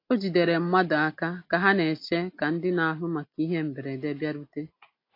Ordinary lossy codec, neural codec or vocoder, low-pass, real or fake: AAC, 32 kbps; none; 5.4 kHz; real